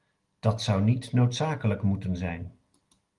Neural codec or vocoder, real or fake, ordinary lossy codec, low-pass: none; real; Opus, 24 kbps; 10.8 kHz